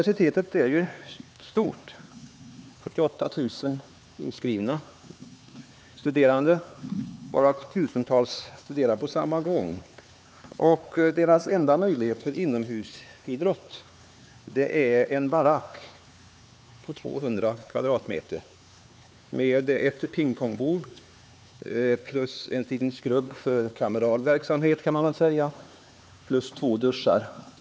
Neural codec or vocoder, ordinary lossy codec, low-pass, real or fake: codec, 16 kHz, 4 kbps, X-Codec, HuBERT features, trained on LibriSpeech; none; none; fake